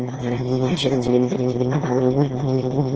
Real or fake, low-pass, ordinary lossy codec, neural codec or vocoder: fake; 7.2 kHz; Opus, 16 kbps; autoencoder, 22.05 kHz, a latent of 192 numbers a frame, VITS, trained on one speaker